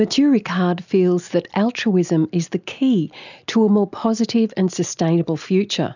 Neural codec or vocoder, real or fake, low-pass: none; real; 7.2 kHz